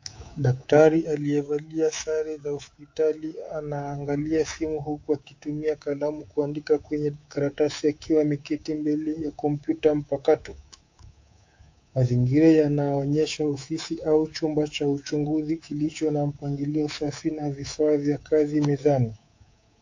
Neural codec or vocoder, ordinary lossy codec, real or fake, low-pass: codec, 24 kHz, 3.1 kbps, DualCodec; AAC, 48 kbps; fake; 7.2 kHz